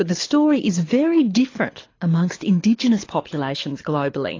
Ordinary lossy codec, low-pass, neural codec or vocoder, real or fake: AAC, 32 kbps; 7.2 kHz; codec, 24 kHz, 6 kbps, HILCodec; fake